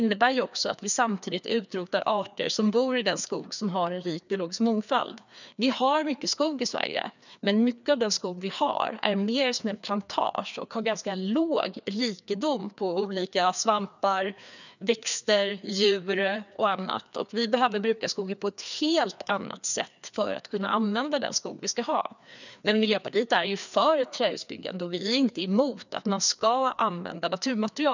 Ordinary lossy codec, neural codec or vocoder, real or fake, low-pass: none; codec, 16 kHz, 2 kbps, FreqCodec, larger model; fake; 7.2 kHz